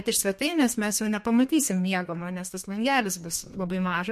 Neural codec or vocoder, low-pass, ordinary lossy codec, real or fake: codec, 32 kHz, 1.9 kbps, SNAC; 14.4 kHz; MP3, 64 kbps; fake